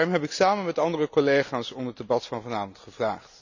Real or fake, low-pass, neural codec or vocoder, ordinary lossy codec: real; 7.2 kHz; none; none